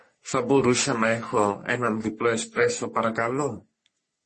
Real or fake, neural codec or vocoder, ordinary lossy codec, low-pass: fake; codec, 44.1 kHz, 3.4 kbps, Pupu-Codec; MP3, 32 kbps; 10.8 kHz